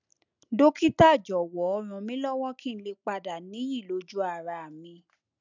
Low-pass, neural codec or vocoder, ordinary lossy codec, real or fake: 7.2 kHz; none; none; real